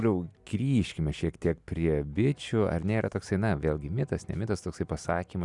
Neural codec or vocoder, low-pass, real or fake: none; 10.8 kHz; real